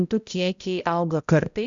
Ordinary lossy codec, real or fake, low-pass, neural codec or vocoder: Opus, 64 kbps; fake; 7.2 kHz; codec, 16 kHz, 0.5 kbps, X-Codec, HuBERT features, trained on balanced general audio